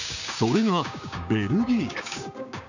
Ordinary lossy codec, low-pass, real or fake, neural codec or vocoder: none; 7.2 kHz; fake; codec, 16 kHz, 6 kbps, DAC